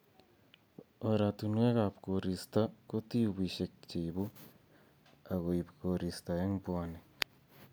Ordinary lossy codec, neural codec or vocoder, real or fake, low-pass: none; none; real; none